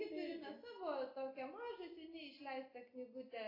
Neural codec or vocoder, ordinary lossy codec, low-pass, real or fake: none; AAC, 24 kbps; 5.4 kHz; real